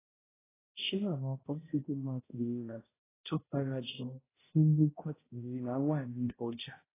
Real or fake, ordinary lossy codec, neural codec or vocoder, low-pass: fake; AAC, 16 kbps; codec, 16 kHz, 0.5 kbps, X-Codec, HuBERT features, trained on balanced general audio; 3.6 kHz